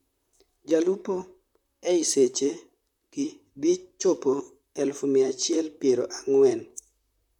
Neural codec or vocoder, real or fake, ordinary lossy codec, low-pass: vocoder, 44.1 kHz, 128 mel bands, Pupu-Vocoder; fake; none; 19.8 kHz